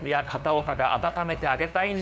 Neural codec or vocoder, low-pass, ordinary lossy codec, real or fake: codec, 16 kHz, 2 kbps, FunCodec, trained on LibriTTS, 25 frames a second; none; none; fake